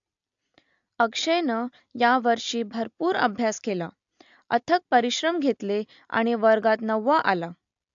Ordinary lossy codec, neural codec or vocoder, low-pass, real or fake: AAC, 64 kbps; none; 7.2 kHz; real